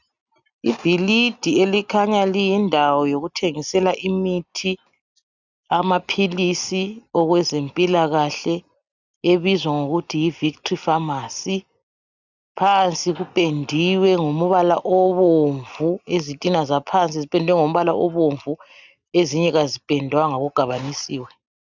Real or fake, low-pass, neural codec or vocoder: real; 7.2 kHz; none